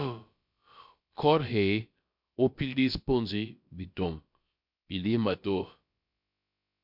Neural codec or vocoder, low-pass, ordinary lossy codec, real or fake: codec, 16 kHz, about 1 kbps, DyCAST, with the encoder's durations; 5.4 kHz; MP3, 48 kbps; fake